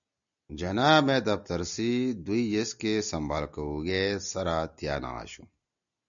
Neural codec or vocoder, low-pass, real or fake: none; 7.2 kHz; real